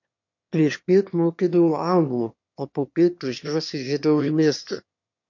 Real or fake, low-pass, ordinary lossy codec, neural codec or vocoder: fake; 7.2 kHz; MP3, 48 kbps; autoencoder, 22.05 kHz, a latent of 192 numbers a frame, VITS, trained on one speaker